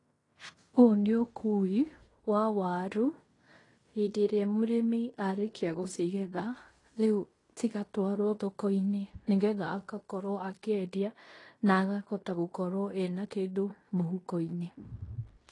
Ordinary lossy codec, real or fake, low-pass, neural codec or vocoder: AAC, 32 kbps; fake; 10.8 kHz; codec, 16 kHz in and 24 kHz out, 0.9 kbps, LongCat-Audio-Codec, fine tuned four codebook decoder